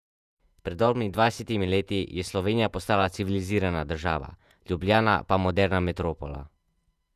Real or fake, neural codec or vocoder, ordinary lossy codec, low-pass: fake; vocoder, 48 kHz, 128 mel bands, Vocos; none; 14.4 kHz